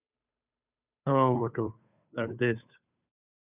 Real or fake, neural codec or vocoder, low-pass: fake; codec, 16 kHz, 2 kbps, FunCodec, trained on Chinese and English, 25 frames a second; 3.6 kHz